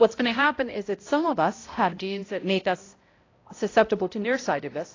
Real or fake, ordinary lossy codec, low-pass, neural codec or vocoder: fake; AAC, 32 kbps; 7.2 kHz; codec, 16 kHz, 0.5 kbps, X-Codec, HuBERT features, trained on balanced general audio